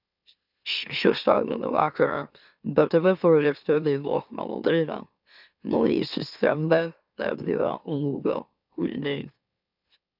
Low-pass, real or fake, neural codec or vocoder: 5.4 kHz; fake; autoencoder, 44.1 kHz, a latent of 192 numbers a frame, MeloTTS